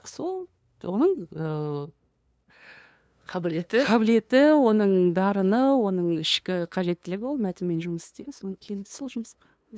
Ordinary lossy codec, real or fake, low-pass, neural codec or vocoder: none; fake; none; codec, 16 kHz, 2 kbps, FunCodec, trained on LibriTTS, 25 frames a second